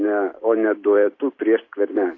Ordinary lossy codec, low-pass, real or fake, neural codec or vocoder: AAC, 32 kbps; 7.2 kHz; fake; vocoder, 44.1 kHz, 128 mel bands every 256 samples, BigVGAN v2